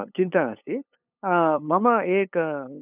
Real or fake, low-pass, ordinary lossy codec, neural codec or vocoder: fake; 3.6 kHz; none; codec, 16 kHz, 8 kbps, FunCodec, trained on LibriTTS, 25 frames a second